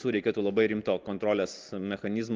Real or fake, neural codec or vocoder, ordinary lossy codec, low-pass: real; none; Opus, 24 kbps; 7.2 kHz